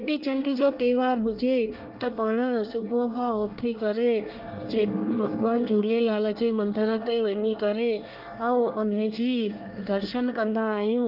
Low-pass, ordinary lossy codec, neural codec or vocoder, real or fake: 5.4 kHz; Opus, 24 kbps; codec, 24 kHz, 1 kbps, SNAC; fake